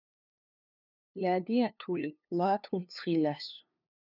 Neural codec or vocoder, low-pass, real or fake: codec, 16 kHz, 2 kbps, FunCodec, trained on LibriTTS, 25 frames a second; 5.4 kHz; fake